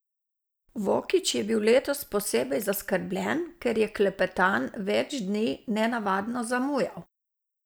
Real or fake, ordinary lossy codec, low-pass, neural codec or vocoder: real; none; none; none